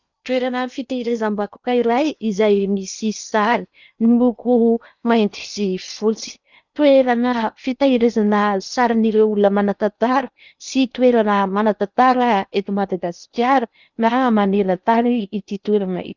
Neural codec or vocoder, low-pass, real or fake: codec, 16 kHz in and 24 kHz out, 0.8 kbps, FocalCodec, streaming, 65536 codes; 7.2 kHz; fake